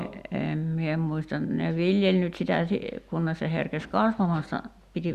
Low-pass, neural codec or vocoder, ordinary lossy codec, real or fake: 14.4 kHz; none; Opus, 64 kbps; real